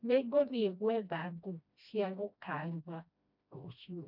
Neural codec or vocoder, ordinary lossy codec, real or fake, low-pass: codec, 16 kHz, 1 kbps, FreqCodec, smaller model; none; fake; 5.4 kHz